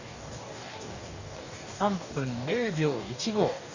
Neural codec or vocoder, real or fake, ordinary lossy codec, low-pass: codec, 44.1 kHz, 2.6 kbps, DAC; fake; none; 7.2 kHz